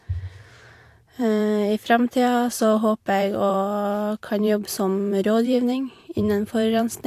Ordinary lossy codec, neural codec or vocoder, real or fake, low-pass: AAC, 64 kbps; vocoder, 44.1 kHz, 128 mel bands every 512 samples, BigVGAN v2; fake; 14.4 kHz